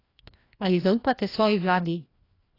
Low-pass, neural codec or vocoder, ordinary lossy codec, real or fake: 5.4 kHz; codec, 16 kHz, 1 kbps, FreqCodec, larger model; AAC, 24 kbps; fake